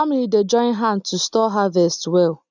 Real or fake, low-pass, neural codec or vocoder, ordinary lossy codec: real; 7.2 kHz; none; none